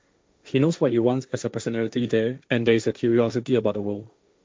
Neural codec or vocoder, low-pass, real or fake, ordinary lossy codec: codec, 16 kHz, 1.1 kbps, Voila-Tokenizer; none; fake; none